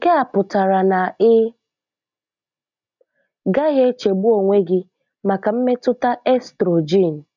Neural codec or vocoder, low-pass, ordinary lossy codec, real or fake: none; 7.2 kHz; none; real